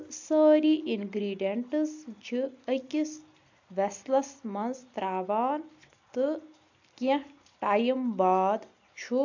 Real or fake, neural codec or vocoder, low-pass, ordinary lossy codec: real; none; 7.2 kHz; AAC, 48 kbps